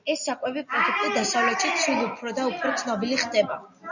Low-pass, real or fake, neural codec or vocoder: 7.2 kHz; real; none